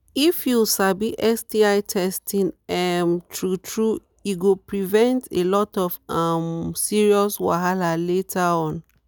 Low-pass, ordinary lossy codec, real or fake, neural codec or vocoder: none; none; real; none